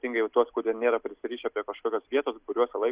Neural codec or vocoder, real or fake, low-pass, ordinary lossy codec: none; real; 3.6 kHz; Opus, 64 kbps